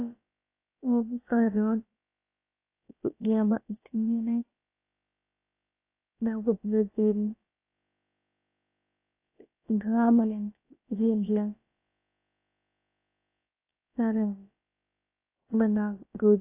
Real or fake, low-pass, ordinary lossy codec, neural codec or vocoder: fake; 3.6 kHz; AAC, 24 kbps; codec, 16 kHz, about 1 kbps, DyCAST, with the encoder's durations